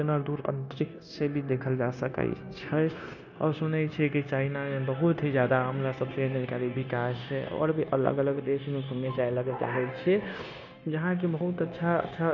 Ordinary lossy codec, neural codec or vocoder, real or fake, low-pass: none; codec, 16 kHz, 0.9 kbps, LongCat-Audio-Codec; fake; 7.2 kHz